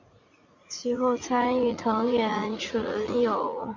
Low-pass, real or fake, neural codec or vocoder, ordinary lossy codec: 7.2 kHz; fake; vocoder, 44.1 kHz, 80 mel bands, Vocos; MP3, 64 kbps